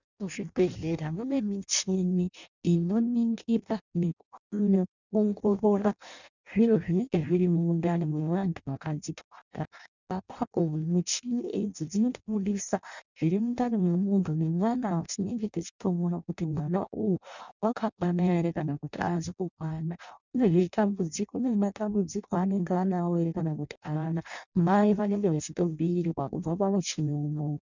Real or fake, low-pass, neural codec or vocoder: fake; 7.2 kHz; codec, 16 kHz in and 24 kHz out, 0.6 kbps, FireRedTTS-2 codec